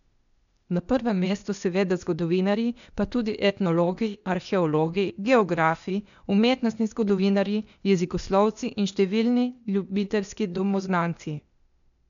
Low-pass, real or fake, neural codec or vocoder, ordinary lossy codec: 7.2 kHz; fake; codec, 16 kHz, 0.8 kbps, ZipCodec; none